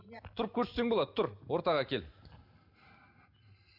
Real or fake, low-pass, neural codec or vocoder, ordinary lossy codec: real; 5.4 kHz; none; none